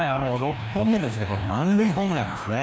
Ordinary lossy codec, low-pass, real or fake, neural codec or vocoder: none; none; fake; codec, 16 kHz, 1 kbps, FreqCodec, larger model